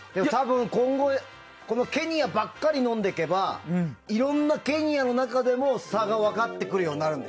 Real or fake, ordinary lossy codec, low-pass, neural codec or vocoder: real; none; none; none